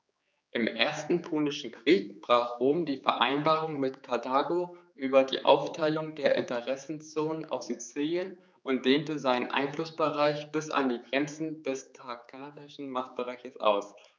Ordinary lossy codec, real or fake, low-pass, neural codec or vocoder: none; fake; none; codec, 16 kHz, 4 kbps, X-Codec, HuBERT features, trained on general audio